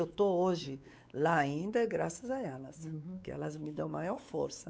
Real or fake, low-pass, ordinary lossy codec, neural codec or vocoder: fake; none; none; codec, 16 kHz, 4 kbps, X-Codec, WavLM features, trained on Multilingual LibriSpeech